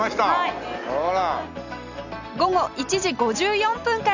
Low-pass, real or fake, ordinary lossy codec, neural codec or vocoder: 7.2 kHz; real; none; none